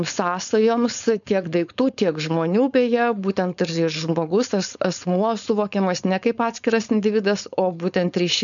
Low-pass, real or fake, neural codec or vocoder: 7.2 kHz; fake; codec, 16 kHz, 4.8 kbps, FACodec